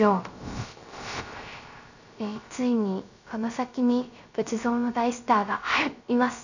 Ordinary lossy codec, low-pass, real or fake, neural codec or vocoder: none; 7.2 kHz; fake; codec, 16 kHz, 0.3 kbps, FocalCodec